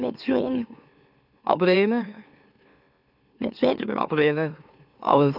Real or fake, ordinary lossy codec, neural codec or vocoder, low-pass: fake; none; autoencoder, 44.1 kHz, a latent of 192 numbers a frame, MeloTTS; 5.4 kHz